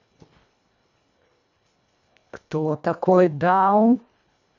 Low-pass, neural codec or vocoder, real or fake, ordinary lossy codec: 7.2 kHz; codec, 24 kHz, 1.5 kbps, HILCodec; fake; none